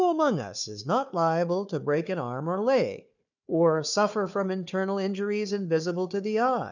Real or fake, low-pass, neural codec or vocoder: fake; 7.2 kHz; codec, 16 kHz, 4 kbps, FunCodec, trained on Chinese and English, 50 frames a second